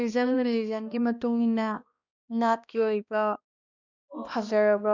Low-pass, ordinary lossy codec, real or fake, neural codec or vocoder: 7.2 kHz; none; fake; codec, 16 kHz, 1 kbps, X-Codec, HuBERT features, trained on balanced general audio